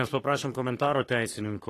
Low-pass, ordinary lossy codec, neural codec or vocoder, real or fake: 14.4 kHz; AAC, 48 kbps; codec, 44.1 kHz, 3.4 kbps, Pupu-Codec; fake